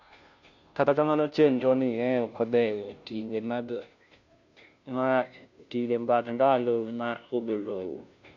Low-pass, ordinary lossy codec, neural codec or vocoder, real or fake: 7.2 kHz; none; codec, 16 kHz, 0.5 kbps, FunCodec, trained on Chinese and English, 25 frames a second; fake